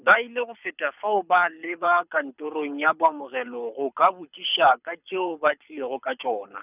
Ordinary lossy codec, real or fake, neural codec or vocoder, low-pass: none; fake; codec, 24 kHz, 6 kbps, HILCodec; 3.6 kHz